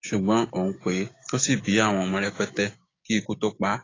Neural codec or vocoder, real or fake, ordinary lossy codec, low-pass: codec, 16 kHz, 6 kbps, DAC; fake; AAC, 32 kbps; 7.2 kHz